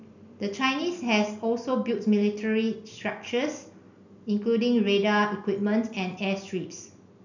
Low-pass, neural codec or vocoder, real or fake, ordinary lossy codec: 7.2 kHz; none; real; none